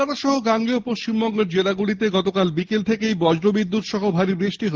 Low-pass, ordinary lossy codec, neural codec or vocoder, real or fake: 7.2 kHz; Opus, 16 kbps; none; real